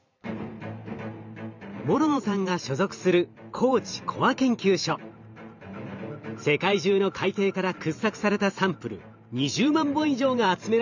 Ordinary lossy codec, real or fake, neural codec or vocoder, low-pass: none; fake; vocoder, 44.1 kHz, 128 mel bands every 512 samples, BigVGAN v2; 7.2 kHz